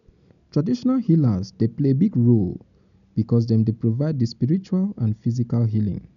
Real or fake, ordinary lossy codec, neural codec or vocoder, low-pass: real; none; none; 7.2 kHz